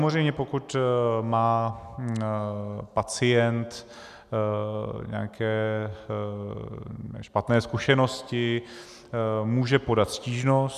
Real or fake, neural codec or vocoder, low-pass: real; none; 14.4 kHz